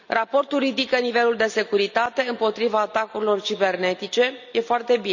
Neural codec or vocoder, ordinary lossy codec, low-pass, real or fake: none; none; 7.2 kHz; real